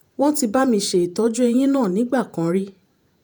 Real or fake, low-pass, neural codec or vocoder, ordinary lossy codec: fake; none; vocoder, 48 kHz, 128 mel bands, Vocos; none